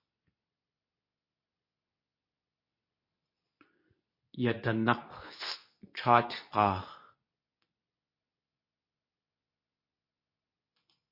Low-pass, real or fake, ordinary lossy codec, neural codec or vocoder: 5.4 kHz; fake; MP3, 32 kbps; codec, 24 kHz, 0.9 kbps, WavTokenizer, medium speech release version 2